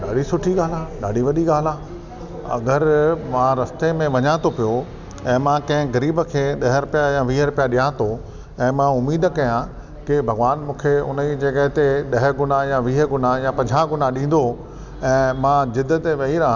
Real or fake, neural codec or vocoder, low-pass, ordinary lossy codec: real; none; 7.2 kHz; none